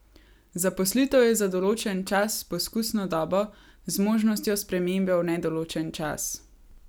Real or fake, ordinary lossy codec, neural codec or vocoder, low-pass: real; none; none; none